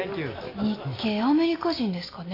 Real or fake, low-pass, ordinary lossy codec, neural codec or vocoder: real; 5.4 kHz; AAC, 24 kbps; none